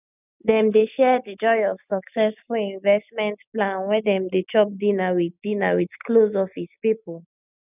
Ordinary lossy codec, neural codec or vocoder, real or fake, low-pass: none; none; real; 3.6 kHz